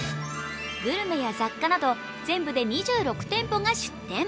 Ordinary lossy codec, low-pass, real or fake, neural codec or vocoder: none; none; real; none